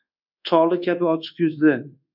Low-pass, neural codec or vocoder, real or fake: 5.4 kHz; codec, 24 kHz, 1.2 kbps, DualCodec; fake